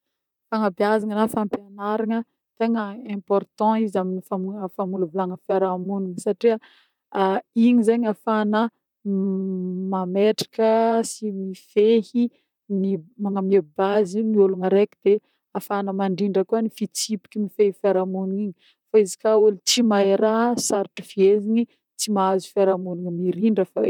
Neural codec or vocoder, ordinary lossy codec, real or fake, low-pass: vocoder, 44.1 kHz, 128 mel bands, Pupu-Vocoder; none; fake; 19.8 kHz